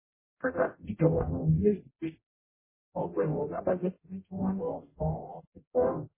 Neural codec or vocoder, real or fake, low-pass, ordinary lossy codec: codec, 44.1 kHz, 0.9 kbps, DAC; fake; 3.6 kHz; MP3, 16 kbps